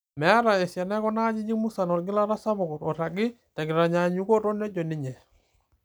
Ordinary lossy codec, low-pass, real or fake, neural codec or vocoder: none; none; real; none